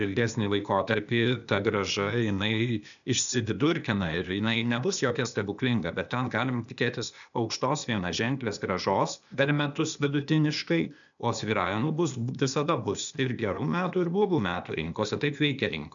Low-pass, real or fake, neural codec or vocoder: 7.2 kHz; fake; codec, 16 kHz, 0.8 kbps, ZipCodec